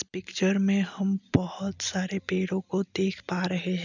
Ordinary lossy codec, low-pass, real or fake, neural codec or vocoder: none; 7.2 kHz; real; none